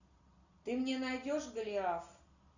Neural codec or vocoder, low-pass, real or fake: none; 7.2 kHz; real